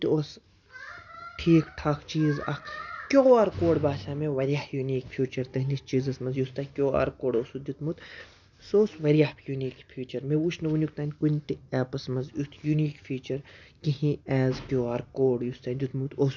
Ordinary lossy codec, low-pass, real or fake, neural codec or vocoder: none; 7.2 kHz; real; none